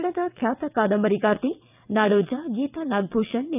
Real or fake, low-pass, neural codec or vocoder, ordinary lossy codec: fake; 3.6 kHz; vocoder, 22.05 kHz, 80 mel bands, WaveNeXt; none